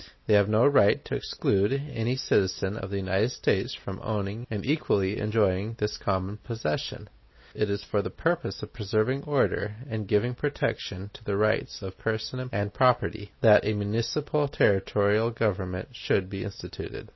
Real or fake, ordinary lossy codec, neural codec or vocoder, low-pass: real; MP3, 24 kbps; none; 7.2 kHz